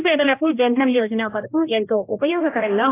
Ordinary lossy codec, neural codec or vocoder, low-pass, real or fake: AAC, 16 kbps; codec, 16 kHz, 1 kbps, X-Codec, HuBERT features, trained on general audio; 3.6 kHz; fake